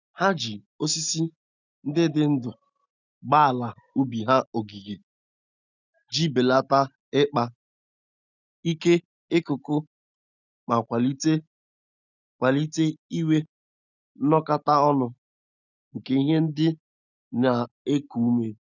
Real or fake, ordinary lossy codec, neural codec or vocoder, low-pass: real; none; none; 7.2 kHz